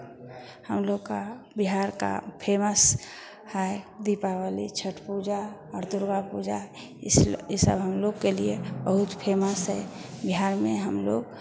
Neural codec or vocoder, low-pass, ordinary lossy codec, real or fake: none; none; none; real